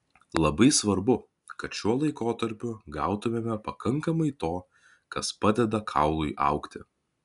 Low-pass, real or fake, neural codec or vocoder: 10.8 kHz; real; none